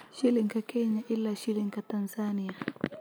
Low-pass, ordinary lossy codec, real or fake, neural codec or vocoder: none; none; real; none